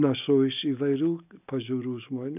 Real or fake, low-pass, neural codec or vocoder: real; 3.6 kHz; none